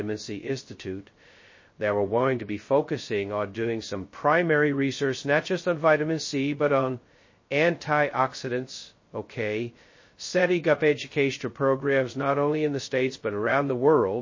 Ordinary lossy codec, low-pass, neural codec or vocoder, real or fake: MP3, 32 kbps; 7.2 kHz; codec, 16 kHz, 0.2 kbps, FocalCodec; fake